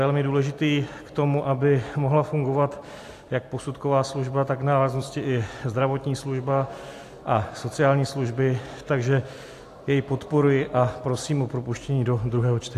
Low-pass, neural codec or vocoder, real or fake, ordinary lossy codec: 14.4 kHz; none; real; AAC, 64 kbps